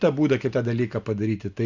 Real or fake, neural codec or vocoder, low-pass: real; none; 7.2 kHz